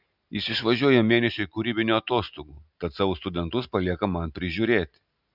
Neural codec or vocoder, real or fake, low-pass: none; real; 5.4 kHz